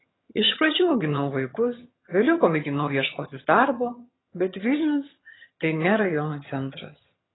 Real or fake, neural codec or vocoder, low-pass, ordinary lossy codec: fake; vocoder, 22.05 kHz, 80 mel bands, HiFi-GAN; 7.2 kHz; AAC, 16 kbps